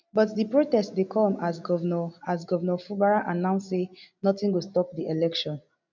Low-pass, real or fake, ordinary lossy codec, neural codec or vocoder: 7.2 kHz; real; MP3, 64 kbps; none